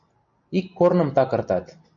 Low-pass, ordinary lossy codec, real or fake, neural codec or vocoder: 7.2 kHz; MP3, 64 kbps; real; none